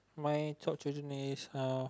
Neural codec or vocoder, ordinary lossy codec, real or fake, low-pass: none; none; real; none